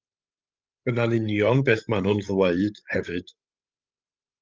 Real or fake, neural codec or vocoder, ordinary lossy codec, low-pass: fake; codec, 16 kHz, 16 kbps, FreqCodec, larger model; Opus, 32 kbps; 7.2 kHz